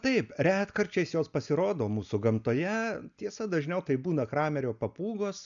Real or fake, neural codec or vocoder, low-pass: real; none; 7.2 kHz